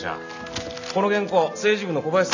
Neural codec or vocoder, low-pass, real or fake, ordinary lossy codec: none; 7.2 kHz; real; none